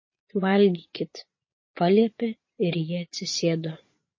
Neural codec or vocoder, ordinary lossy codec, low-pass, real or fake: vocoder, 22.05 kHz, 80 mel bands, Vocos; MP3, 32 kbps; 7.2 kHz; fake